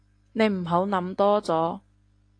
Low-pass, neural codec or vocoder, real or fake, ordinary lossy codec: 9.9 kHz; none; real; AAC, 48 kbps